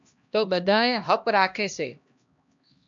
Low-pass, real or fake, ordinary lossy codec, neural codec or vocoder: 7.2 kHz; fake; MP3, 64 kbps; codec, 16 kHz, 1 kbps, X-Codec, HuBERT features, trained on LibriSpeech